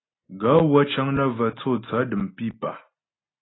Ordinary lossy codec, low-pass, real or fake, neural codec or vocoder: AAC, 16 kbps; 7.2 kHz; real; none